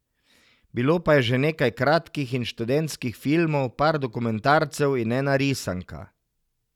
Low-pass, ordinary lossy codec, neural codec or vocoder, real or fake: 19.8 kHz; none; none; real